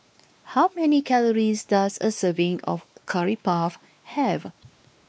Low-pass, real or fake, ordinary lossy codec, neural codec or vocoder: none; fake; none; codec, 16 kHz, 2 kbps, X-Codec, WavLM features, trained on Multilingual LibriSpeech